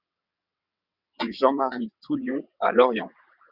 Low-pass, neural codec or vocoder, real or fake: 5.4 kHz; vocoder, 22.05 kHz, 80 mel bands, WaveNeXt; fake